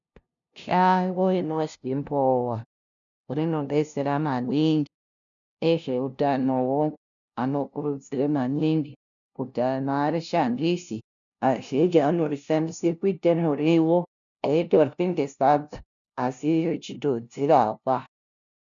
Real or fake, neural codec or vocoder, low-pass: fake; codec, 16 kHz, 0.5 kbps, FunCodec, trained on LibriTTS, 25 frames a second; 7.2 kHz